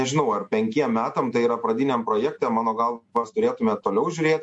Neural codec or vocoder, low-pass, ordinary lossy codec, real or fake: none; 10.8 kHz; MP3, 48 kbps; real